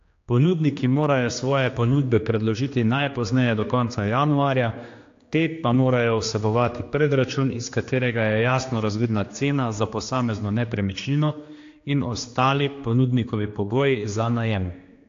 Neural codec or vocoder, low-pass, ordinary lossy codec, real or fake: codec, 16 kHz, 2 kbps, X-Codec, HuBERT features, trained on general audio; 7.2 kHz; AAC, 48 kbps; fake